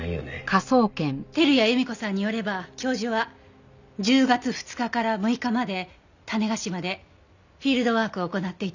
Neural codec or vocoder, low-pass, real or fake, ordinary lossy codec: none; 7.2 kHz; real; none